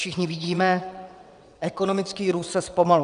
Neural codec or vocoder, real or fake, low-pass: vocoder, 22.05 kHz, 80 mel bands, WaveNeXt; fake; 9.9 kHz